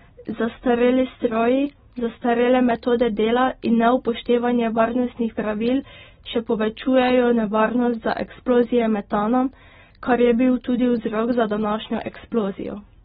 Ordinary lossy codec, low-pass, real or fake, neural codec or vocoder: AAC, 16 kbps; 9.9 kHz; real; none